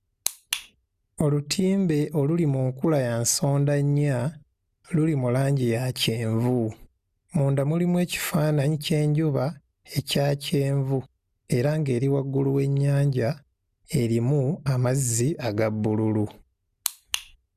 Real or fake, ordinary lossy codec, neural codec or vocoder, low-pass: real; Opus, 64 kbps; none; 14.4 kHz